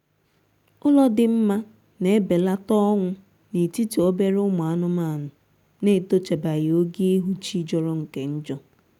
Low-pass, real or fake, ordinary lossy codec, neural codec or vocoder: 19.8 kHz; real; none; none